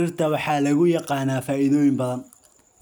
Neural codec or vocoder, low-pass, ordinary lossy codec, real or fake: none; none; none; real